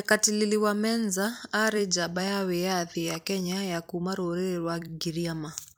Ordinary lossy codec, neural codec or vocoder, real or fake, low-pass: none; vocoder, 44.1 kHz, 128 mel bands every 256 samples, BigVGAN v2; fake; 19.8 kHz